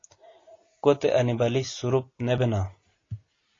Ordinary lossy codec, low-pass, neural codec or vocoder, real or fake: AAC, 32 kbps; 7.2 kHz; none; real